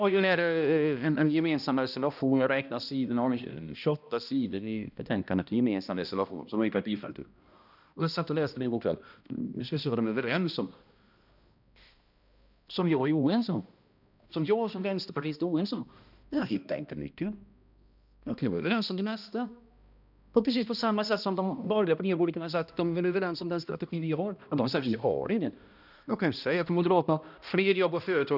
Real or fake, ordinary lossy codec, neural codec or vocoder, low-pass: fake; none; codec, 16 kHz, 1 kbps, X-Codec, HuBERT features, trained on balanced general audio; 5.4 kHz